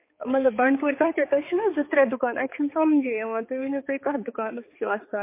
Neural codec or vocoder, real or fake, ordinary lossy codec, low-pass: codec, 16 kHz, 4 kbps, X-Codec, HuBERT features, trained on balanced general audio; fake; MP3, 24 kbps; 3.6 kHz